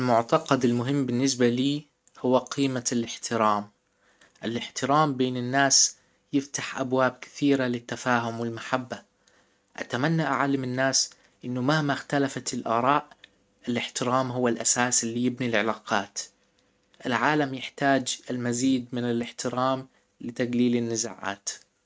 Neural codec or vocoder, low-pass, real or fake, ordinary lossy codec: none; none; real; none